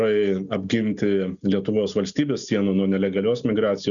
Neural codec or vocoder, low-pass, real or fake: none; 7.2 kHz; real